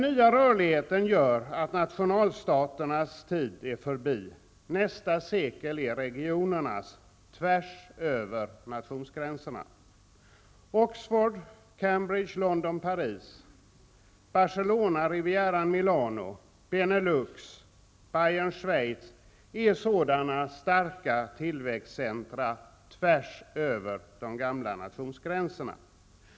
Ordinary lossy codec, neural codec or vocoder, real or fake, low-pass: none; none; real; none